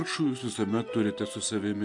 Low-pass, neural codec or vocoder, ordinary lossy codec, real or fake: 10.8 kHz; none; AAC, 64 kbps; real